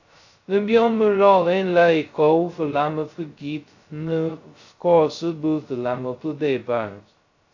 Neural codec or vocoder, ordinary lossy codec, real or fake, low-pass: codec, 16 kHz, 0.2 kbps, FocalCodec; AAC, 48 kbps; fake; 7.2 kHz